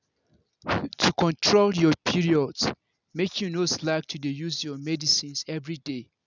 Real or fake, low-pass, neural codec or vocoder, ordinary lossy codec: real; 7.2 kHz; none; none